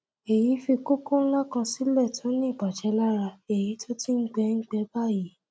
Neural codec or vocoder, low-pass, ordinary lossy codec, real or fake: none; none; none; real